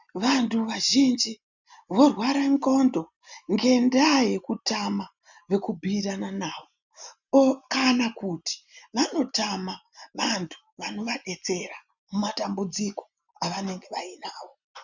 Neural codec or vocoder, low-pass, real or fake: none; 7.2 kHz; real